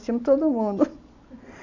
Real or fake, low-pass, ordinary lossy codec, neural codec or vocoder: real; 7.2 kHz; none; none